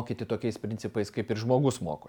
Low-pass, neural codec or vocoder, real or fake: 19.8 kHz; none; real